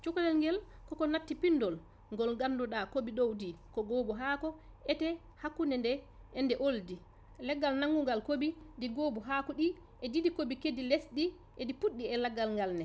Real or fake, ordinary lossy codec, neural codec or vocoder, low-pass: real; none; none; none